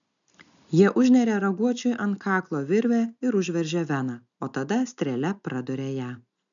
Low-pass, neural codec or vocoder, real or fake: 7.2 kHz; none; real